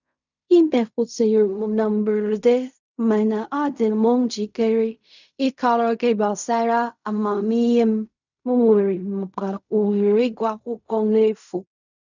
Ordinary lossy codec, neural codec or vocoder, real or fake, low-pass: none; codec, 16 kHz in and 24 kHz out, 0.4 kbps, LongCat-Audio-Codec, fine tuned four codebook decoder; fake; 7.2 kHz